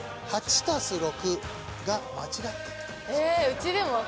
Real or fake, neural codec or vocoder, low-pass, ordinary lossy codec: real; none; none; none